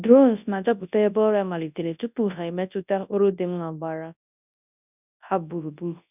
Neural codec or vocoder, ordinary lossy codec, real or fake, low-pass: codec, 24 kHz, 0.9 kbps, WavTokenizer, large speech release; none; fake; 3.6 kHz